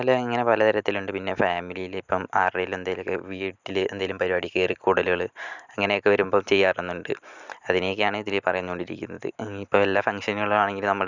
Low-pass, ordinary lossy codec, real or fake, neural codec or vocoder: 7.2 kHz; Opus, 64 kbps; real; none